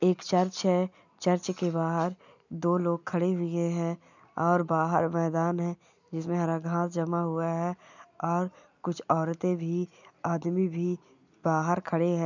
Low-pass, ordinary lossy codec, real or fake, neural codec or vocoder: 7.2 kHz; none; real; none